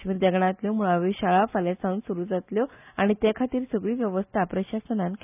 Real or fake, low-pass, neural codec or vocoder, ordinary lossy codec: real; 3.6 kHz; none; none